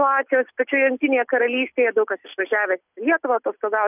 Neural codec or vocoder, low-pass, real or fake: none; 3.6 kHz; real